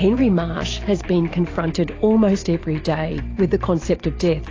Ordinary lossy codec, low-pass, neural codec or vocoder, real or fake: AAC, 32 kbps; 7.2 kHz; vocoder, 44.1 kHz, 128 mel bands every 512 samples, BigVGAN v2; fake